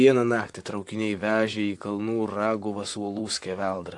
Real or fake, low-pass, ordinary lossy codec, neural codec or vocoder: fake; 10.8 kHz; AAC, 64 kbps; vocoder, 44.1 kHz, 128 mel bands, Pupu-Vocoder